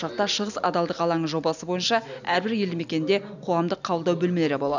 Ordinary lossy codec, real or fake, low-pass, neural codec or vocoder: none; real; 7.2 kHz; none